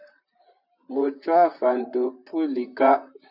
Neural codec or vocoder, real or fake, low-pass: vocoder, 44.1 kHz, 128 mel bands, Pupu-Vocoder; fake; 5.4 kHz